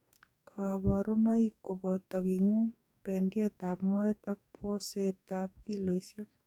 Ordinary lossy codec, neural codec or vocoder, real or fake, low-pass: none; codec, 44.1 kHz, 2.6 kbps, DAC; fake; 19.8 kHz